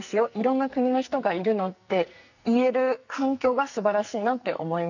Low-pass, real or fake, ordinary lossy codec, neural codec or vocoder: 7.2 kHz; fake; none; codec, 44.1 kHz, 2.6 kbps, SNAC